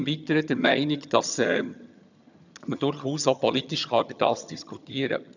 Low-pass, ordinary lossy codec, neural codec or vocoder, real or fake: 7.2 kHz; none; vocoder, 22.05 kHz, 80 mel bands, HiFi-GAN; fake